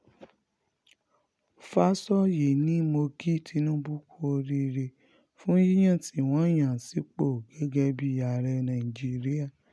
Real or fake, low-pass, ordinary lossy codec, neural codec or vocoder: real; none; none; none